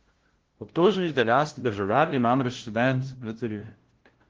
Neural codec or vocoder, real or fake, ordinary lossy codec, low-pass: codec, 16 kHz, 0.5 kbps, FunCodec, trained on LibriTTS, 25 frames a second; fake; Opus, 16 kbps; 7.2 kHz